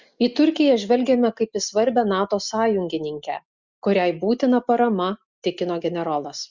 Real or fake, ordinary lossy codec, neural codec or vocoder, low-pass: real; Opus, 64 kbps; none; 7.2 kHz